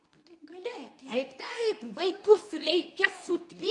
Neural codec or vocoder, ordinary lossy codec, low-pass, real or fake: codec, 24 kHz, 0.9 kbps, WavTokenizer, medium speech release version 2; AAC, 64 kbps; 10.8 kHz; fake